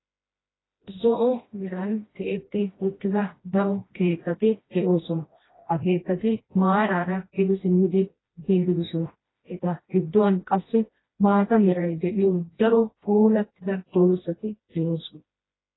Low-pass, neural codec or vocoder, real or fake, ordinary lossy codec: 7.2 kHz; codec, 16 kHz, 1 kbps, FreqCodec, smaller model; fake; AAC, 16 kbps